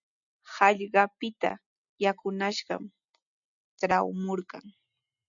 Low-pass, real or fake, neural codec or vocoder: 7.2 kHz; real; none